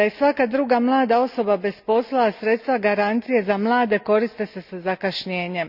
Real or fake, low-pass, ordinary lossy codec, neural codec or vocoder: real; 5.4 kHz; none; none